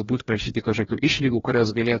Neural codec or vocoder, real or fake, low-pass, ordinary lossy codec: codec, 16 kHz, 1 kbps, FreqCodec, larger model; fake; 7.2 kHz; AAC, 24 kbps